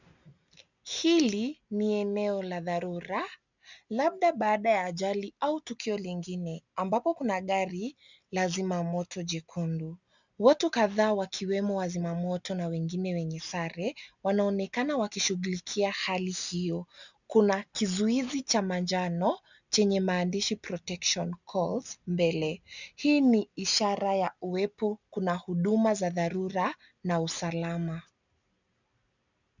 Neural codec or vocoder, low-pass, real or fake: none; 7.2 kHz; real